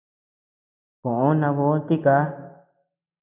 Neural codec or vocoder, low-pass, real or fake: none; 3.6 kHz; real